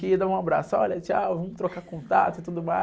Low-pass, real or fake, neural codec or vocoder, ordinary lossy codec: none; real; none; none